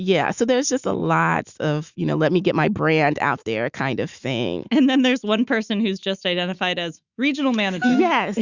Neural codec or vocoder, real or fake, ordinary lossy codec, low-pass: autoencoder, 48 kHz, 128 numbers a frame, DAC-VAE, trained on Japanese speech; fake; Opus, 64 kbps; 7.2 kHz